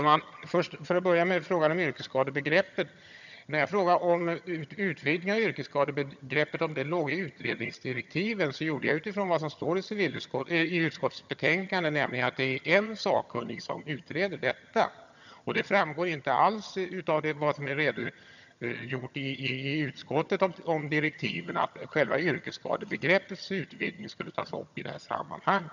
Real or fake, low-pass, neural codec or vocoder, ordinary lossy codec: fake; 7.2 kHz; vocoder, 22.05 kHz, 80 mel bands, HiFi-GAN; none